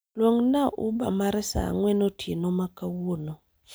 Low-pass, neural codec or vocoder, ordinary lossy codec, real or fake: none; none; none; real